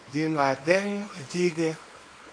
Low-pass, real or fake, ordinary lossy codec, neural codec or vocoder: 9.9 kHz; fake; AAC, 48 kbps; codec, 24 kHz, 0.9 kbps, WavTokenizer, small release